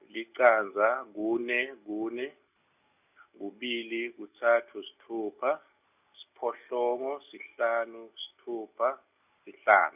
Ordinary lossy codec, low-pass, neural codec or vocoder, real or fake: none; 3.6 kHz; none; real